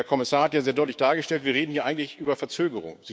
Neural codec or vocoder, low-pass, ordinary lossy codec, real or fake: codec, 16 kHz, 6 kbps, DAC; none; none; fake